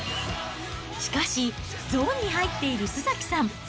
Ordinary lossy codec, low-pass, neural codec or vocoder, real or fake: none; none; none; real